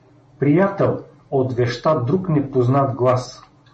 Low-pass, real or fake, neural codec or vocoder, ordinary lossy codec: 10.8 kHz; real; none; MP3, 32 kbps